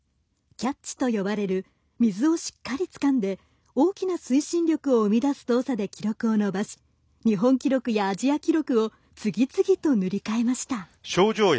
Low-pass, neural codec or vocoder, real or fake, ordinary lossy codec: none; none; real; none